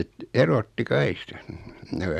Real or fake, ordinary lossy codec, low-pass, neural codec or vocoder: real; none; 14.4 kHz; none